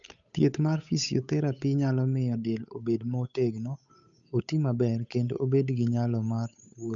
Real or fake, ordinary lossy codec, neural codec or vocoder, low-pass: fake; none; codec, 16 kHz, 8 kbps, FunCodec, trained on Chinese and English, 25 frames a second; 7.2 kHz